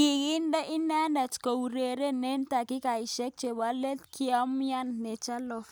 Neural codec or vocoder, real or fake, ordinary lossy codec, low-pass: none; real; none; none